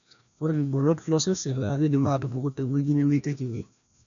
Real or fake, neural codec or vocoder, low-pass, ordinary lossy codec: fake; codec, 16 kHz, 1 kbps, FreqCodec, larger model; 7.2 kHz; none